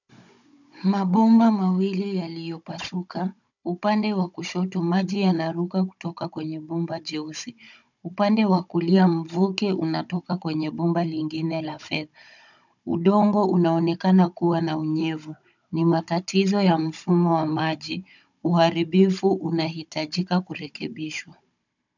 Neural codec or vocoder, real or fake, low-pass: codec, 16 kHz, 16 kbps, FunCodec, trained on Chinese and English, 50 frames a second; fake; 7.2 kHz